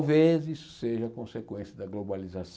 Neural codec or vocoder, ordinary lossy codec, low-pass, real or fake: none; none; none; real